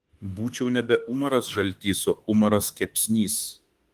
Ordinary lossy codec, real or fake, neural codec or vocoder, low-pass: Opus, 24 kbps; fake; autoencoder, 48 kHz, 32 numbers a frame, DAC-VAE, trained on Japanese speech; 14.4 kHz